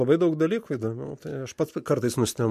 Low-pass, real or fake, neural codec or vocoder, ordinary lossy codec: 14.4 kHz; real; none; MP3, 64 kbps